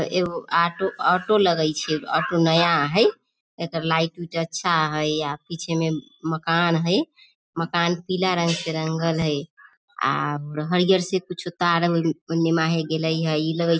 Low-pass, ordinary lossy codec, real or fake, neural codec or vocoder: none; none; real; none